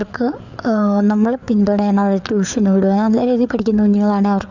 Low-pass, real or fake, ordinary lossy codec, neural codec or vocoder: 7.2 kHz; fake; none; codec, 16 kHz, 4 kbps, FreqCodec, larger model